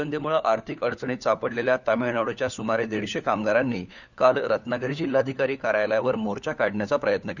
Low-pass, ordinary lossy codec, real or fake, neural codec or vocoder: 7.2 kHz; none; fake; codec, 16 kHz, 4 kbps, FunCodec, trained on LibriTTS, 50 frames a second